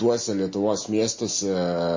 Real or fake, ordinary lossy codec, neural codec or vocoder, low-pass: real; MP3, 32 kbps; none; 7.2 kHz